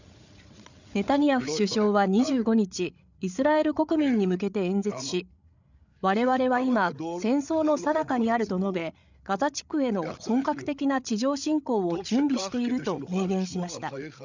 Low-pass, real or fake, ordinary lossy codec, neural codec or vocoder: 7.2 kHz; fake; none; codec, 16 kHz, 8 kbps, FreqCodec, larger model